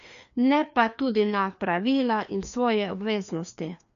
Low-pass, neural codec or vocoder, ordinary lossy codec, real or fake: 7.2 kHz; codec, 16 kHz, 2 kbps, FreqCodec, larger model; MP3, 96 kbps; fake